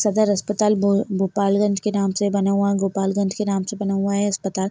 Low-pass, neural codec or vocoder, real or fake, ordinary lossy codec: none; none; real; none